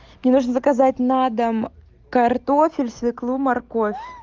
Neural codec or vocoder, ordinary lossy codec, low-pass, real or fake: none; Opus, 32 kbps; 7.2 kHz; real